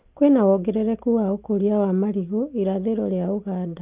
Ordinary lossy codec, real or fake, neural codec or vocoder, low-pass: Opus, 24 kbps; real; none; 3.6 kHz